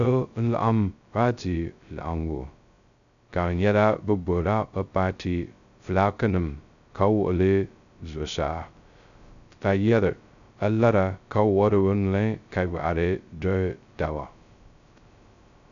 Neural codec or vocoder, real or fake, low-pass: codec, 16 kHz, 0.2 kbps, FocalCodec; fake; 7.2 kHz